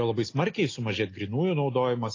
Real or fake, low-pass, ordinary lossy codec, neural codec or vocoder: real; 7.2 kHz; AAC, 32 kbps; none